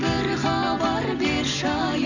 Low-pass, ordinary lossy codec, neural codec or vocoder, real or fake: 7.2 kHz; none; none; real